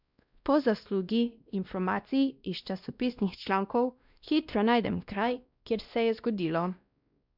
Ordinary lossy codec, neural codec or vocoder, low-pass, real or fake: none; codec, 16 kHz, 1 kbps, X-Codec, WavLM features, trained on Multilingual LibriSpeech; 5.4 kHz; fake